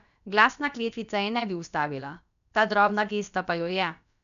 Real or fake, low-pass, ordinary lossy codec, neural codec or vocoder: fake; 7.2 kHz; none; codec, 16 kHz, about 1 kbps, DyCAST, with the encoder's durations